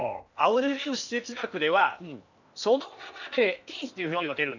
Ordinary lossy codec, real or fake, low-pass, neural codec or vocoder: none; fake; 7.2 kHz; codec, 16 kHz in and 24 kHz out, 0.8 kbps, FocalCodec, streaming, 65536 codes